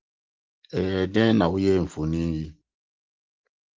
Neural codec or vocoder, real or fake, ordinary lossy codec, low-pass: none; real; Opus, 16 kbps; 7.2 kHz